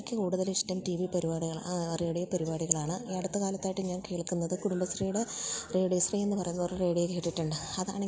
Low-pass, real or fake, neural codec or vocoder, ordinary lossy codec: none; real; none; none